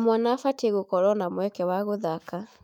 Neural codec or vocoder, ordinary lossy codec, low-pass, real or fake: autoencoder, 48 kHz, 128 numbers a frame, DAC-VAE, trained on Japanese speech; none; 19.8 kHz; fake